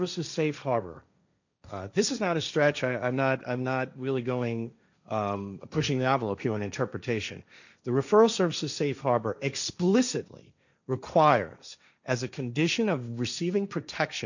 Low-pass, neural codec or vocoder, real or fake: 7.2 kHz; codec, 16 kHz, 1.1 kbps, Voila-Tokenizer; fake